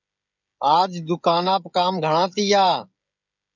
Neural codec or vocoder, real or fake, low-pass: codec, 16 kHz, 16 kbps, FreqCodec, smaller model; fake; 7.2 kHz